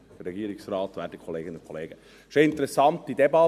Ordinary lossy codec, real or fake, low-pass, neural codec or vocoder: none; real; 14.4 kHz; none